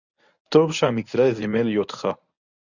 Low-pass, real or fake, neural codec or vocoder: 7.2 kHz; fake; codec, 24 kHz, 0.9 kbps, WavTokenizer, medium speech release version 1